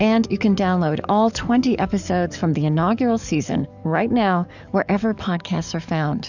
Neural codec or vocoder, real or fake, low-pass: codec, 44.1 kHz, 7.8 kbps, DAC; fake; 7.2 kHz